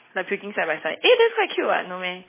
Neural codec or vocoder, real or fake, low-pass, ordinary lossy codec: none; real; 3.6 kHz; MP3, 16 kbps